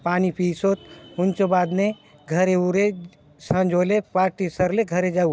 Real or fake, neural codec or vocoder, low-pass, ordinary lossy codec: real; none; none; none